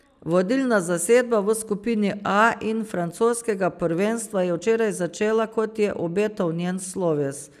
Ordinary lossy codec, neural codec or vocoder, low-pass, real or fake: none; none; 14.4 kHz; real